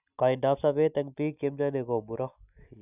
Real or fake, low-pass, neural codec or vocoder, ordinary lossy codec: real; 3.6 kHz; none; none